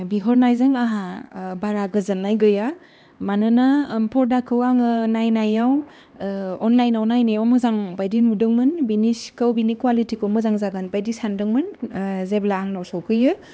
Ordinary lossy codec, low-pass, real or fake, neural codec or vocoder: none; none; fake; codec, 16 kHz, 2 kbps, X-Codec, HuBERT features, trained on LibriSpeech